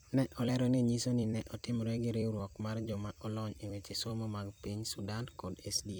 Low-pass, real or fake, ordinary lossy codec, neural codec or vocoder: none; fake; none; vocoder, 44.1 kHz, 128 mel bands every 256 samples, BigVGAN v2